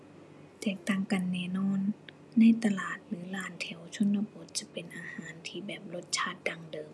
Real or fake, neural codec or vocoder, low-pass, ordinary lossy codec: real; none; none; none